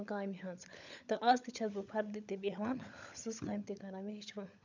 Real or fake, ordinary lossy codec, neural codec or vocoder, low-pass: fake; none; codec, 16 kHz, 16 kbps, FunCodec, trained on LibriTTS, 50 frames a second; 7.2 kHz